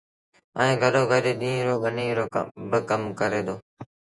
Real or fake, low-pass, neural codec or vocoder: fake; 10.8 kHz; vocoder, 48 kHz, 128 mel bands, Vocos